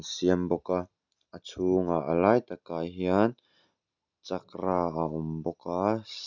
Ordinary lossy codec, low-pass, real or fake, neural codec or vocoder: none; 7.2 kHz; real; none